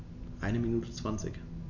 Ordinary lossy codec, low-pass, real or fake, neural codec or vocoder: none; 7.2 kHz; real; none